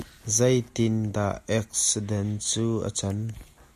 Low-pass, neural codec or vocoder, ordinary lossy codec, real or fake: 14.4 kHz; none; MP3, 64 kbps; real